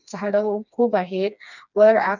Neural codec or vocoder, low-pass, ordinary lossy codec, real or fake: codec, 16 kHz, 2 kbps, FreqCodec, smaller model; 7.2 kHz; none; fake